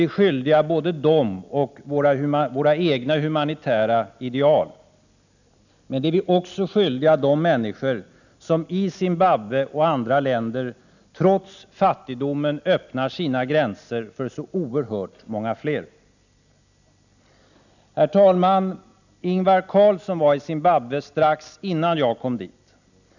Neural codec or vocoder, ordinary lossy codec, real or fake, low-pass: none; none; real; 7.2 kHz